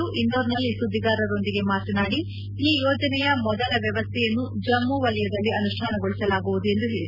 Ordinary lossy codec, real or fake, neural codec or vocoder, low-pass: none; real; none; 5.4 kHz